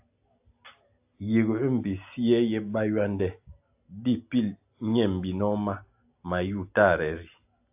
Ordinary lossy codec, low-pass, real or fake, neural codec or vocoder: AAC, 32 kbps; 3.6 kHz; real; none